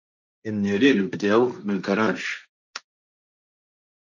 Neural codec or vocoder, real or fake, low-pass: codec, 16 kHz, 1.1 kbps, Voila-Tokenizer; fake; 7.2 kHz